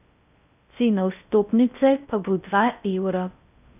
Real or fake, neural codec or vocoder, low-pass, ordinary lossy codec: fake; codec, 16 kHz in and 24 kHz out, 0.6 kbps, FocalCodec, streaming, 4096 codes; 3.6 kHz; none